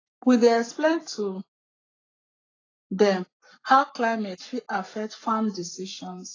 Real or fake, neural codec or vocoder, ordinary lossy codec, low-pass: fake; codec, 44.1 kHz, 7.8 kbps, Pupu-Codec; AAC, 32 kbps; 7.2 kHz